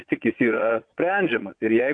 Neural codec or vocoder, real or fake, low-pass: vocoder, 22.05 kHz, 80 mel bands, Vocos; fake; 9.9 kHz